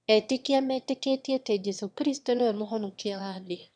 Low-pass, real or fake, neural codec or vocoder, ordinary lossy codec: 9.9 kHz; fake; autoencoder, 22.05 kHz, a latent of 192 numbers a frame, VITS, trained on one speaker; none